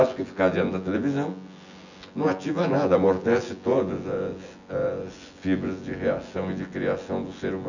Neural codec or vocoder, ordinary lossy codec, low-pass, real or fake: vocoder, 24 kHz, 100 mel bands, Vocos; none; 7.2 kHz; fake